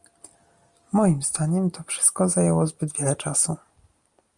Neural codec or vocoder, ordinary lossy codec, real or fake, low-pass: none; Opus, 32 kbps; real; 10.8 kHz